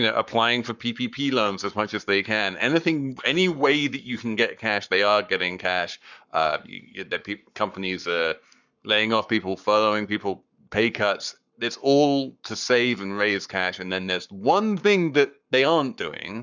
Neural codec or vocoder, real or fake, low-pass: codec, 44.1 kHz, 7.8 kbps, Pupu-Codec; fake; 7.2 kHz